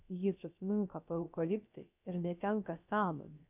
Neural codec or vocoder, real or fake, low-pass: codec, 16 kHz, 0.3 kbps, FocalCodec; fake; 3.6 kHz